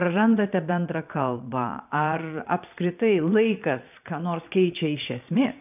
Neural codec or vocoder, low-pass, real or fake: vocoder, 22.05 kHz, 80 mel bands, Vocos; 3.6 kHz; fake